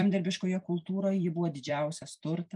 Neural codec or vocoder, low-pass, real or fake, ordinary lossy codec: vocoder, 44.1 kHz, 128 mel bands every 256 samples, BigVGAN v2; 10.8 kHz; fake; MP3, 96 kbps